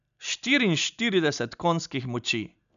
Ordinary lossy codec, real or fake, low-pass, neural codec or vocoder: none; real; 7.2 kHz; none